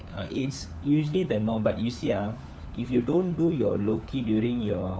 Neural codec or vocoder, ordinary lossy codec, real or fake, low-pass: codec, 16 kHz, 4 kbps, FunCodec, trained on LibriTTS, 50 frames a second; none; fake; none